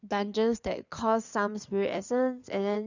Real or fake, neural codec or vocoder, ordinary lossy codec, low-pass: fake; codec, 16 kHz in and 24 kHz out, 2.2 kbps, FireRedTTS-2 codec; none; 7.2 kHz